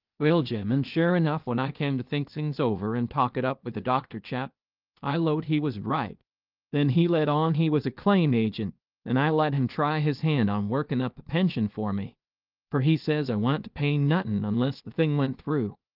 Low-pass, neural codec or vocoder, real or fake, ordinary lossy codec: 5.4 kHz; codec, 16 kHz, 0.8 kbps, ZipCodec; fake; Opus, 24 kbps